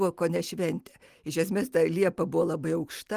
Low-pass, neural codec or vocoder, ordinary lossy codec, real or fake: 14.4 kHz; none; Opus, 24 kbps; real